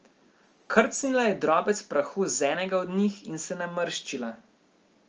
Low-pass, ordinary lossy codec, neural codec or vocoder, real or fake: 7.2 kHz; Opus, 24 kbps; none; real